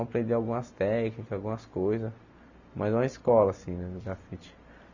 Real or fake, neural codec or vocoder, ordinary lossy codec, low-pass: real; none; none; 7.2 kHz